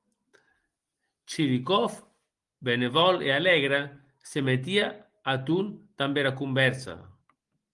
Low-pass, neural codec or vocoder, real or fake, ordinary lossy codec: 10.8 kHz; none; real; Opus, 24 kbps